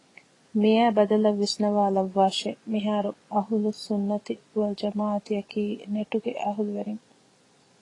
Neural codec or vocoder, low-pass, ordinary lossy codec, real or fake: vocoder, 24 kHz, 100 mel bands, Vocos; 10.8 kHz; AAC, 32 kbps; fake